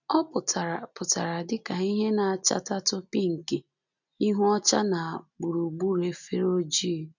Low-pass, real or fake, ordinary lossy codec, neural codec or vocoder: 7.2 kHz; real; none; none